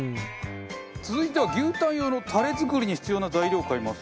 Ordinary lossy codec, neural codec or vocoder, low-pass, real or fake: none; none; none; real